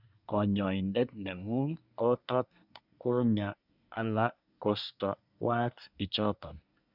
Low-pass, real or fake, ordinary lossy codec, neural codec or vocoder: 5.4 kHz; fake; none; codec, 24 kHz, 1 kbps, SNAC